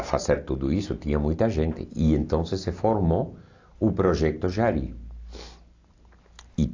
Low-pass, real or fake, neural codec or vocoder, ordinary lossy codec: 7.2 kHz; real; none; AAC, 48 kbps